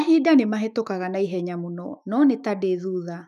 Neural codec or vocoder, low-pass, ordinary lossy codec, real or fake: autoencoder, 48 kHz, 128 numbers a frame, DAC-VAE, trained on Japanese speech; 14.4 kHz; none; fake